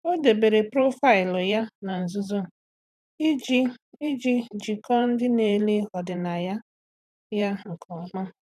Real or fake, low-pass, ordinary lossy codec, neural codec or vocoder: fake; 14.4 kHz; none; vocoder, 44.1 kHz, 128 mel bands every 512 samples, BigVGAN v2